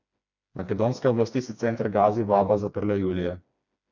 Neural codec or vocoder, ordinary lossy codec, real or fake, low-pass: codec, 16 kHz, 2 kbps, FreqCodec, smaller model; none; fake; 7.2 kHz